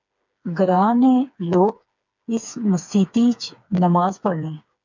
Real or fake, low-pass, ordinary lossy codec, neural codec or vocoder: fake; 7.2 kHz; MP3, 64 kbps; codec, 16 kHz, 4 kbps, FreqCodec, smaller model